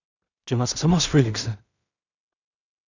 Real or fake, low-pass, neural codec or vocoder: fake; 7.2 kHz; codec, 16 kHz in and 24 kHz out, 0.4 kbps, LongCat-Audio-Codec, two codebook decoder